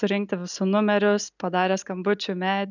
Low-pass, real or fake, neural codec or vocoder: 7.2 kHz; real; none